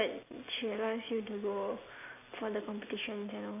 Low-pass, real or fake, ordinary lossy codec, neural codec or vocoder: 3.6 kHz; real; AAC, 24 kbps; none